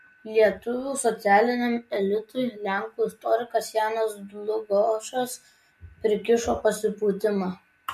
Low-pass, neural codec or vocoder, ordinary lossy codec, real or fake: 14.4 kHz; none; MP3, 64 kbps; real